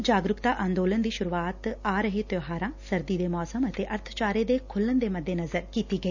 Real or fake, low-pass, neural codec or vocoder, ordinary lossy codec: real; 7.2 kHz; none; none